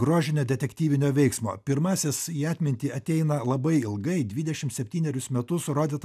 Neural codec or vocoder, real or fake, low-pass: none; real; 14.4 kHz